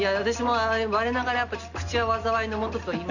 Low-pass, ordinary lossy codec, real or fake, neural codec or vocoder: 7.2 kHz; none; real; none